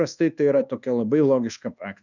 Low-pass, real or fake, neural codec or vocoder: 7.2 kHz; fake; codec, 24 kHz, 1.2 kbps, DualCodec